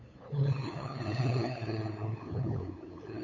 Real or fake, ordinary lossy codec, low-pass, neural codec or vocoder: fake; AAC, 32 kbps; 7.2 kHz; codec, 16 kHz, 8 kbps, FunCodec, trained on LibriTTS, 25 frames a second